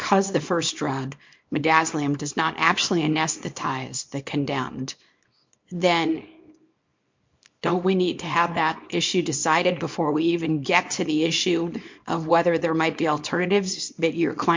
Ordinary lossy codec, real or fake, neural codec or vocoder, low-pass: MP3, 48 kbps; fake; codec, 24 kHz, 0.9 kbps, WavTokenizer, small release; 7.2 kHz